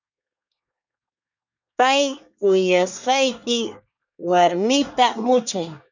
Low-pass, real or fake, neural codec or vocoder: 7.2 kHz; fake; codec, 24 kHz, 1 kbps, SNAC